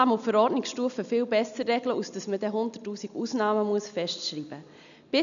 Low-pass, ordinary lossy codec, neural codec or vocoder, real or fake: 7.2 kHz; none; none; real